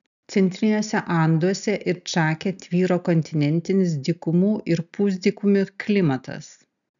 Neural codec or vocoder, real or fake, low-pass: none; real; 7.2 kHz